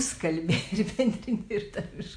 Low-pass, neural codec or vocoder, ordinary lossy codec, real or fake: 9.9 kHz; none; AAC, 64 kbps; real